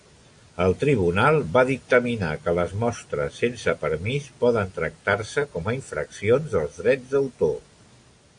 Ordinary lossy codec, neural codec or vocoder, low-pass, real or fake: AAC, 64 kbps; none; 9.9 kHz; real